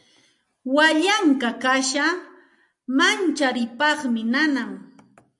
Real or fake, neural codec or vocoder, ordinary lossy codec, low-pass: real; none; AAC, 64 kbps; 10.8 kHz